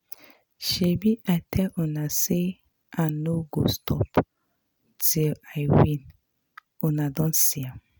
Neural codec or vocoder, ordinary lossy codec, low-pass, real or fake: none; none; none; real